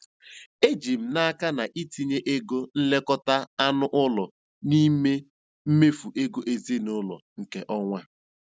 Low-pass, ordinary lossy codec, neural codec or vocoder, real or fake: none; none; none; real